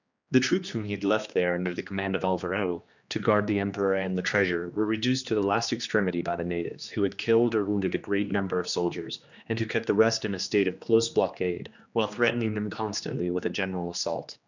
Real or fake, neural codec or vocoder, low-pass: fake; codec, 16 kHz, 2 kbps, X-Codec, HuBERT features, trained on general audio; 7.2 kHz